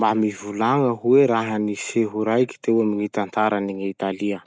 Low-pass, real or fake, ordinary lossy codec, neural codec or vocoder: none; real; none; none